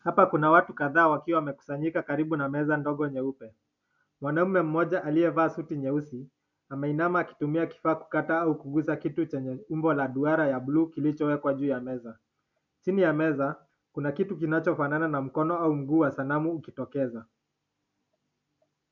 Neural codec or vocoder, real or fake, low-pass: none; real; 7.2 kHz